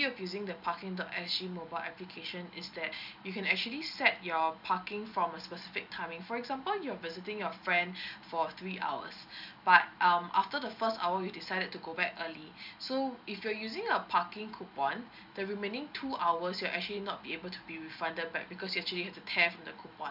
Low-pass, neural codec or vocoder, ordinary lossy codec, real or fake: 5.4 kHz; none; none; real